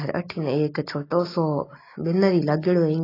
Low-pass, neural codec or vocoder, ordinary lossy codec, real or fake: 5.4 kHz; none; AAC, 24 kbps; real